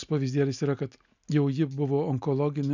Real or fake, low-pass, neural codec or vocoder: real; 7.2 kHz; none